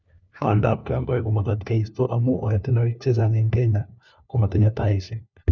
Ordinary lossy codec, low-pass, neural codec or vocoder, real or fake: none; 7.2 kHz; codec, 16 kHz, 1 kbps, FunCodec, trained on LibriTTS, 50 frames a second; fake